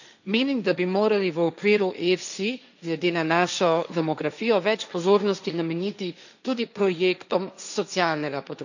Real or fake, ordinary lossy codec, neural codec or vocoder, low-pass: fake; none; codec, 16 kHz, 1.1 kbps, Voila-Tokenizer; 7.2 kHz